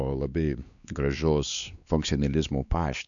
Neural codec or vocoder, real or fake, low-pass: codec, 16 kHz, 2 kbps, X-Codec, WavLM features, trained on Multilingual LibriSpeech; fake; 7.2 kHz